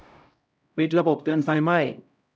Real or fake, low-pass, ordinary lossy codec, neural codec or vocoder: fake; none; none; codec, 16 kHz, 0.5 kbps, X-Codec, HuBERT features, trained on LibriSpeech